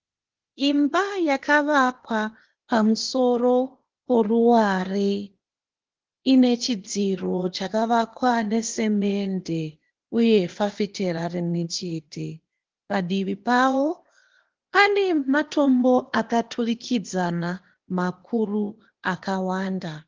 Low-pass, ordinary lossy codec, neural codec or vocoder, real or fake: 7.2 kHz; Opus, 16 kbps; codec, 16 kHz, 0.8 kbps, ZipCodec; fake